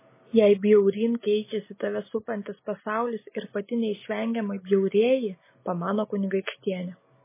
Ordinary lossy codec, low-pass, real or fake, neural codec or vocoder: MP3, 16 kbps; 3.6 kHz; real; none